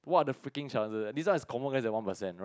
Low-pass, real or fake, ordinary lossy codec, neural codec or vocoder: none; real; none; none